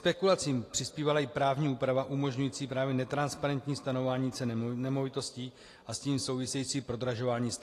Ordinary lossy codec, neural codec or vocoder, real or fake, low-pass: AAC, 48 kbps; none; real; 14.4 kHz